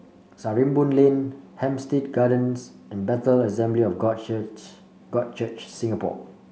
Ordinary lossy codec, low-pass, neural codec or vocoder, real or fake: none; none; none; real